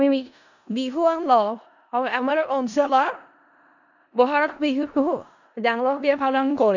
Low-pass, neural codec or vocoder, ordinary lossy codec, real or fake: 7.2 kHz; codec, 16 kHz in and 24 kHz out, 0.4 kbps, LongCat-Audio-Codec, four codebook decoder; none; fake